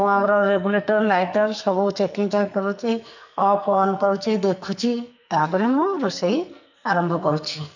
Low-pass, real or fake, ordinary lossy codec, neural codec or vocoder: 7.2 kHz; fake; none; codec, 44.1 kHz, 2.6 kbps, SNAC